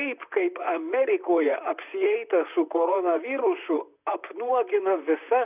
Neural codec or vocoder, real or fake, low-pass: vocoder, 44.1 kHz, 128 mel bands, Pupu-Vocoder; fake; 3.6 kHz